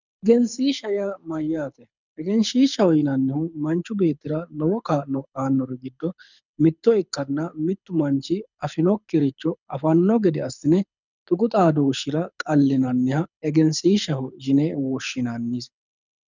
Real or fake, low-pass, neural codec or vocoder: fake; 7.2 kHz; codec, 24 kHz, 6 kbps, HILCodec